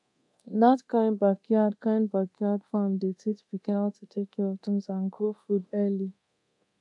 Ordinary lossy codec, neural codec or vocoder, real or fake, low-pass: AAC, 64 kbps; codec, 24 kHz, 0.9 kbps, DualCodec; fake; 10.8 kHz